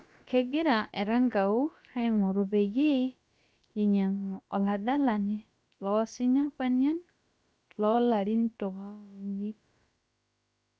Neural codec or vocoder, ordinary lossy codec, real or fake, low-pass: codec, 16 kHz, about 1 kbps, DyCAST, with the encoder's durations; none; fake; none